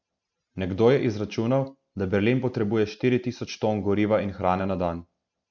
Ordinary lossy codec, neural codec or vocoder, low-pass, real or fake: none; none; 7.2 kHz; real